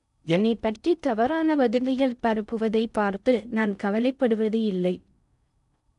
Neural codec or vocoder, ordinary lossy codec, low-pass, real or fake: codec, 16 kHz in and 24 kHz out, 0.6 kbps, FocalCodec, streaming, 4096 codes; none; 10.8 kHz; fake